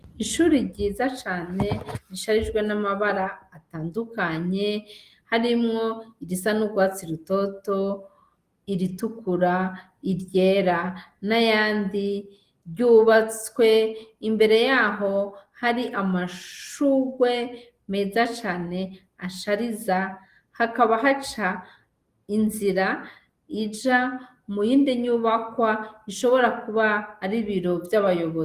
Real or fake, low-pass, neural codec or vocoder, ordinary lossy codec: real; 14.4 kHz; none; Opus, 24 kbps